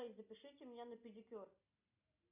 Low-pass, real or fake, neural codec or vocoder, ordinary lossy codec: 3.6 kHz; real; none; MP3, 32 kbps